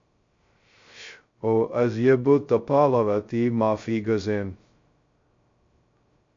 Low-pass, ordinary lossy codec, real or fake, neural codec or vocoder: 7.2 kHz; MP3, 48 kbps; fake; codec, 16 kHz, 0.2 kbps, FocalCodec